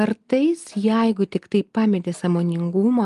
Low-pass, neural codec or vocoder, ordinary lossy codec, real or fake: 10.8 kHz; none; Opus, 32 kbps; real